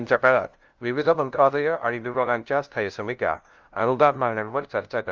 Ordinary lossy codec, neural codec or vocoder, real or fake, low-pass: Opus, 32 kbps; codec, 16 kHz, 0.5 kbps, FunCodec, trained on LibriTTS, 25 frames a second; fake; 7.2 kHz